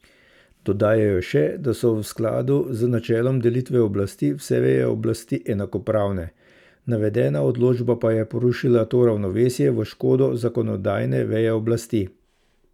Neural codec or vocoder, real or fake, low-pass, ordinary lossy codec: none; real; 19.8 kHz; none